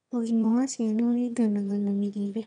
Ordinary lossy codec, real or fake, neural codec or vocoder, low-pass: none; fake; autoencoder, 22.05 kHz, a latent of 192 numbers a frame, VITS, trained on one speaker; 9.9 kHz